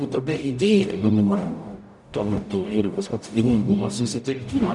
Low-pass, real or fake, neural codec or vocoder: 10.8 kHz; fake; codec, 44.1 kHz, 0.9 kbps, DAC